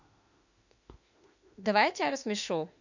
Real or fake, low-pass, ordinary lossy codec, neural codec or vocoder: fake; 7.2 kHz; none; autoencoder, 48 kHz, 32 numbers a frame, DAC-VAE, trained on Japanese speech